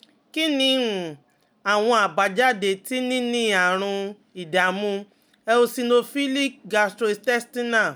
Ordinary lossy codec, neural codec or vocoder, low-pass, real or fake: none; none; none; real